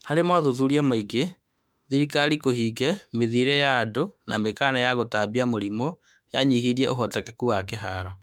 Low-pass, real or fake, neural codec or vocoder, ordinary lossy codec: 19.8 kHz; fake; autoencoder, 48 kHz, 32 numbers a frame, DAC-VAE, trained on Japanese speech; MP3, 96 kbps